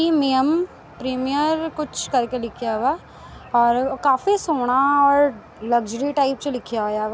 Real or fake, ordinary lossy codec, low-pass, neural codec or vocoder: real; none; none; none